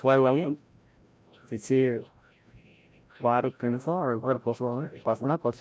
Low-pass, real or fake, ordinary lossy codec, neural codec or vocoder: none; fake; none; codec, 16 kHz, 0.5 kbps, FreqCodec, larger model